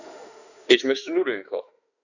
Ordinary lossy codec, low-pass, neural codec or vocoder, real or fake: none; 7.2 kHz; autoencoder, 48 kHz, 32 numbers a frame, DAC-VAE, trained on Japanese speech; fake